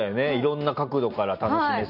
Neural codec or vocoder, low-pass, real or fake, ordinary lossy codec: none; 5.4 kHz; real; none